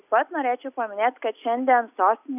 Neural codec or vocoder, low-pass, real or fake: none; 3.6 kHz; real